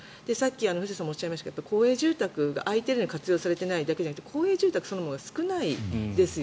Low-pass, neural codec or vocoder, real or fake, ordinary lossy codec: none; none; real; none